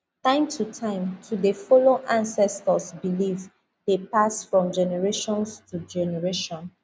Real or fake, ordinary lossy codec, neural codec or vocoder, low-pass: real; none; none; none